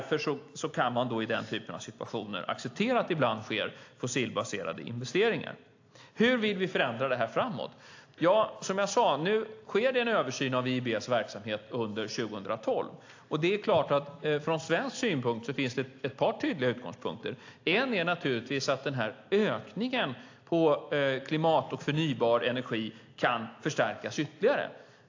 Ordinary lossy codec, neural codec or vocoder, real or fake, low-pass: AAC, 48 kbps; none; real; 7.2 kHz